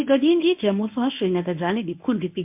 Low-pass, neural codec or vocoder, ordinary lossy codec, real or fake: 3.6 kHz; codec, 24 kHz, 0.9 kbps, WavTokenizer, medium speech release version 1; MP3, 32 kbps; fake